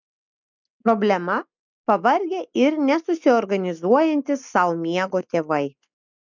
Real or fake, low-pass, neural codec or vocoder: real; 7.2 kHz; none